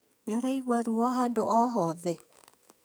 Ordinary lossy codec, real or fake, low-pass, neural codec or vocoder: none; fake; none; codec, 44.1 kHz, 2.6 kbps, SNAC